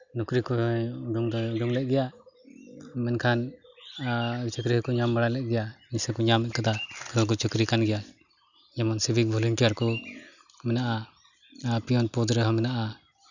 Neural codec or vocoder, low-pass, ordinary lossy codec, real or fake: none; 7.2 kHz; none; real